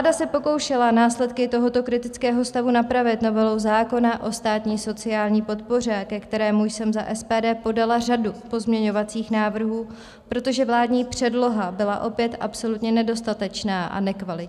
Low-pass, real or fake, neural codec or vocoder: 14.4 kHz; real; none